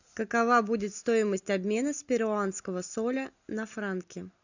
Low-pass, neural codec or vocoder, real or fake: 7.2 kHz; none; real